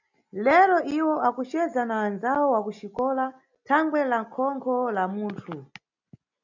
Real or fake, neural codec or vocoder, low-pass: real; none; 7.2 kHz